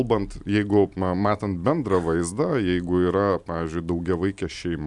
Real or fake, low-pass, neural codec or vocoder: real; 10.8 kHz; none